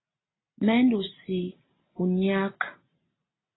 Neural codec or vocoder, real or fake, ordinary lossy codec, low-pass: none; real; AAC, 16 kbps; 7.2 kHz